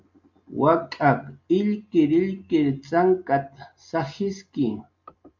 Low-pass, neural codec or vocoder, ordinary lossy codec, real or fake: 7.2 kHz; none; MP3, 48 kbps; real